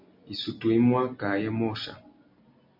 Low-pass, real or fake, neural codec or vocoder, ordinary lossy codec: 5.4 kHz; real; none; MP3, 48 kbps